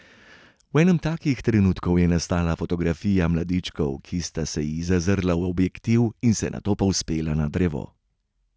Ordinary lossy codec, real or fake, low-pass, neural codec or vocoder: none; real; none; none